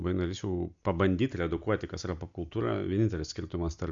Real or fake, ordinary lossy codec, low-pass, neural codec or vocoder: real; MP3, 64 kbps; 7.2 kHz; none